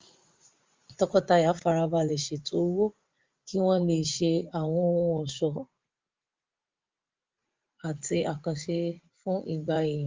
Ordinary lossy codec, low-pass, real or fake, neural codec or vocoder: Opus, 32 kbps; 7.2 kHz; fake; vocoder, 44.1 kHz, 80 mel bands, Vocos